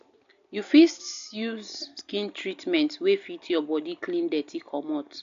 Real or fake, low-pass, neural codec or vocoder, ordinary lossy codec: real; 7.2 kHz; none; AAC, 64 kbps